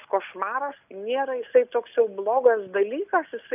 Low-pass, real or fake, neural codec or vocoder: 3.6 kHz; real; none